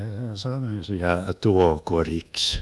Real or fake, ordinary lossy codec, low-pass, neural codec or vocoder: fake; none; none; codec, 24 kHz, 1.2 kbps, DualCodec